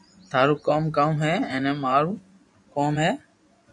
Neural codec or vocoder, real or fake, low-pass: vocoder, 24 kHz, 100 mel bands, Vocos; fake; 10.8 kHz